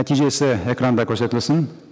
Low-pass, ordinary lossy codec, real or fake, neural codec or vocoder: none; none; real; none